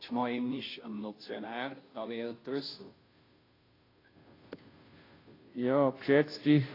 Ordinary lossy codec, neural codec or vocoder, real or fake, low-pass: AAC, 24 kbps; codec, 16 kHz, 0.5 kbps, FunCodec, trained on Chinese and English, 25 frames a second; fake; 5.4 kHz